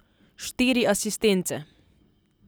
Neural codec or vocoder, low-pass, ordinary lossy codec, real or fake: none; none; none; real